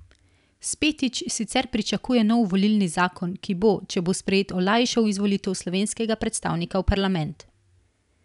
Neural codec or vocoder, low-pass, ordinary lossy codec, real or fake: none; 10.8 kHz; none; real